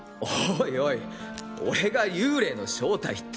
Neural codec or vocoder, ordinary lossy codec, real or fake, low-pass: none; none; real; none